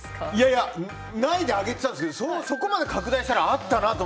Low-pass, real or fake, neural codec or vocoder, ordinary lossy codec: none; real; none; none